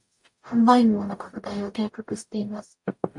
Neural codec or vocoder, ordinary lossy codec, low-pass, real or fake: codec, 44.1 kHz, 0.9 kbps, DAC; MP3, 64 kbps; 10.8 kHz; fake